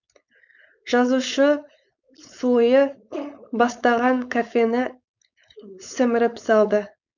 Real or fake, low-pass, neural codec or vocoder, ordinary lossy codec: fake; 7.2 kHz; codec, 16 kHz, 4.8 kbps, FACodec; none